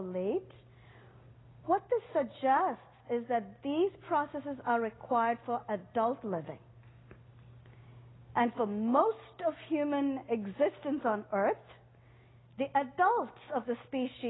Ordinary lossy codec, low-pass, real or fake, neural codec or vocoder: AAC, 16 kbps; 7.2 kHz; real; none